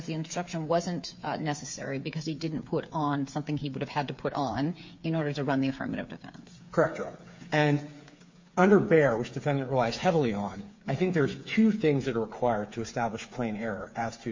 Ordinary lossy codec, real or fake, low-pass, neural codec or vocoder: MP3, 48 kbps; fake; 7.2 kHz; codec, 16 kHz, 8 kbps, FreqCodec, smaller model